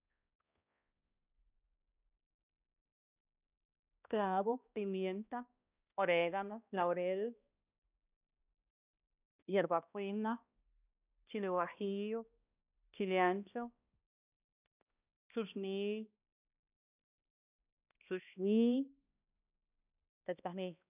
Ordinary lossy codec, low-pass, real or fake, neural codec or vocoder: none; 3.6 kHz; fake; codec, 16 kHz, 1 kbps, X-Codec, HuBERT features, trained on balanced general audio